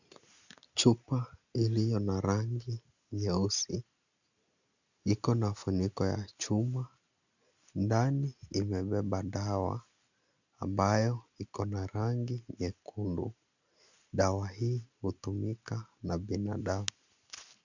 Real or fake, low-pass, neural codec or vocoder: real; 7.2 kHz; none